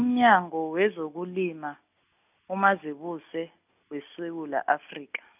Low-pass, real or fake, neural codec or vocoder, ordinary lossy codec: 3.6 kHz; real; none; none